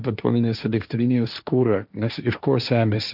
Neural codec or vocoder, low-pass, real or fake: codec, 16 kHz, 1.1 kbps, Voila-Tokenizer; 5.4 kHz; fake